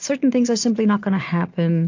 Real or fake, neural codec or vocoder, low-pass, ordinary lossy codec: real; none; 7.2 kHz; AAC, 48 kbps